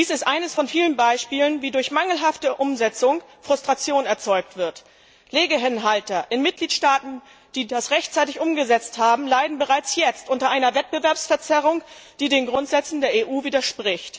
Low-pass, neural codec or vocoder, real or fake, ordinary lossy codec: none; none; real; none